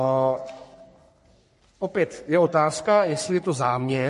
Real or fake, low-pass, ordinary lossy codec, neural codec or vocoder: fake; 14.4 kHz; MP3, 48 kbps; codec, 44.1 kHz, 3.4 kbps, Pupu-Codec